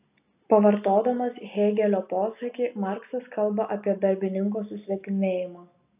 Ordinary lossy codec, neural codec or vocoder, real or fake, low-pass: AAC, 32 kbps; none; real; 3.6 kHz